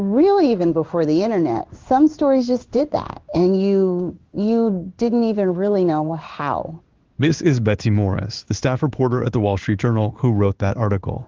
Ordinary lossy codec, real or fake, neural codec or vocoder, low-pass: Opus, 32 kbps; fake; codec, 16 kHz in and 24 kHz out, 1 kbps, XY-Tokenizer; 7.2 kHz